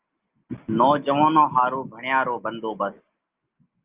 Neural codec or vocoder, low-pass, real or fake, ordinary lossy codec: none; 3.6 kHz; real; Opus, 24 kbps